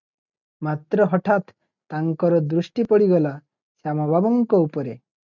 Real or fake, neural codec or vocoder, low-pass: real; none; 7.2 kHz